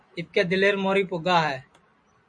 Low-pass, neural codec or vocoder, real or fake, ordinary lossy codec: 9.9 kHz; none; real; MP3, 64 kbps